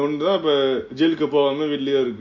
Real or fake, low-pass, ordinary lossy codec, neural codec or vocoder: real; 7.2 kHz; MP3, 48 kbps; none